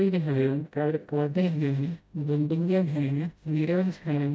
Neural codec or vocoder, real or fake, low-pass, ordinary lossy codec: codec, 16 kHz, 0.5 kbps, FreqCodec, smaller model; fake; none; none